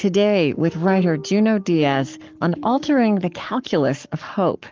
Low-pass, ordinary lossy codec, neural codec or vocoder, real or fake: 7.2 kHz; Opus, 32 kbps; codec, 44.1 kHz, 7.8 kbps, Pupu-Codec; fake